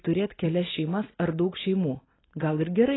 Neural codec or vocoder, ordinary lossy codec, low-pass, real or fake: none; AAC, 16 kbps; 7.2 kHz; real